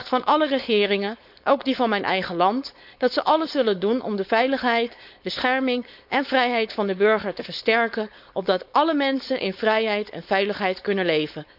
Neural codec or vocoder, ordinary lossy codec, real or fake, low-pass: codec, 16 kHz, 4.8 kbps, FACodec; none; fake; 5.4 kHz